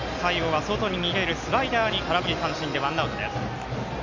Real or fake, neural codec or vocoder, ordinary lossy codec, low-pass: real; none; MP3, 48 kbps; 7.2 kHz